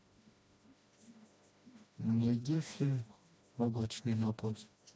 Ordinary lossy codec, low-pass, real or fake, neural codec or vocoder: none; none; fake; codec, 16 kHz, 1 kbps, FreqCodec, smaller model